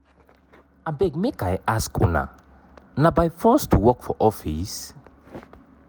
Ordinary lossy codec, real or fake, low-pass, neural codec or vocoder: none; real; none; none